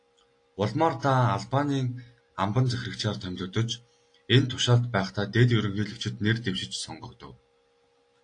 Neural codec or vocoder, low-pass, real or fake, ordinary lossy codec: none; 9.9 kHz; real; AAC, 48 kbps